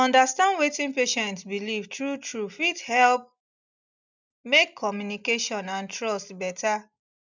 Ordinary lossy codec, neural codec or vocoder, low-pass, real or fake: none; none; 7.2 kHz; real